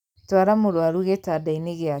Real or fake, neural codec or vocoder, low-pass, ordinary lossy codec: real; none; 19.8 kHz; none